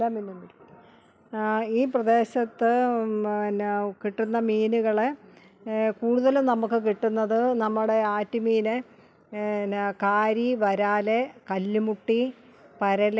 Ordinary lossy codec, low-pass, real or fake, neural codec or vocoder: none; none; real; none